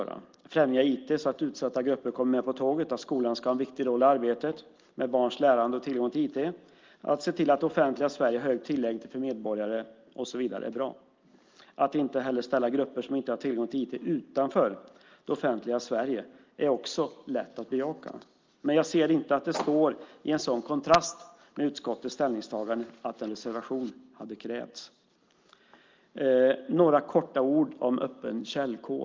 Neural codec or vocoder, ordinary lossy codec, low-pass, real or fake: none; Opus, 24 kbps; 7.2 kHz; real